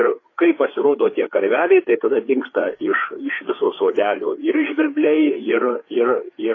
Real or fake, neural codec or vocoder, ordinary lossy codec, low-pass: fake; codec, 16 kHz, 4 kbps, FreqCodec, larger model; AAC, 32 kbps; 7.2 kHz